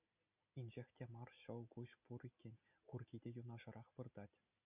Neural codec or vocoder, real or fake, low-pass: none; real; 3.6 kHz